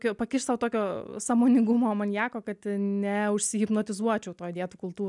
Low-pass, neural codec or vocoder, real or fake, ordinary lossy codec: 10.8 kHz; none; real; MP3, 96 kbps